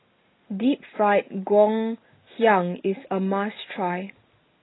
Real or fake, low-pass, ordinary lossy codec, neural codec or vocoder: real; 7.2 kHz; AAC, 16 kbps; none